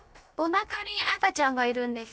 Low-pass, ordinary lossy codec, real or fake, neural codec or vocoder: none; none; fake; codec, 16 kHz, about 1 kbps, DyCAST, with the encoder's durations